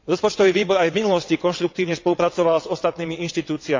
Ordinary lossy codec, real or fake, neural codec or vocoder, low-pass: MP3, 64 kbps; fake; vocoder, 22.05 kHz, 80 mel bands, WaveNeXt; 7.2 kHz